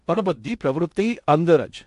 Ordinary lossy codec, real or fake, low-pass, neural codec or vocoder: AAC, 64 kbps; fake; 10.8 kHz; codec, 16 kHz in and 24 kHz out, 0.6 kbps, FocalCodec, streaming, 2048 codes